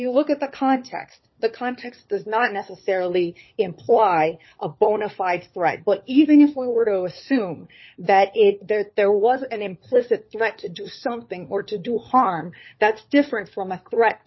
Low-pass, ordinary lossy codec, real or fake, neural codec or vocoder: 7.2 kHz; MP3, 24 kbps; fake; codec, 16 kHz, 4 kbps, FunCodec, trained on LibriTTS, 50 frames a second